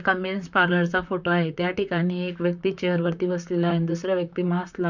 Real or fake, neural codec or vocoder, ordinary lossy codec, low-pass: fake; vocoder, 44.1 kHz, 128 mel bands, Pupu-Vocoder; none; 7.2 kHz